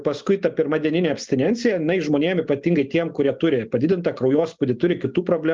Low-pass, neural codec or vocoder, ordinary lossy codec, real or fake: 7.2 kHz; none; Opus, 24 kbps; real